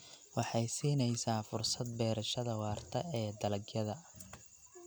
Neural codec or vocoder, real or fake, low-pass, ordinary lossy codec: none; real; none; none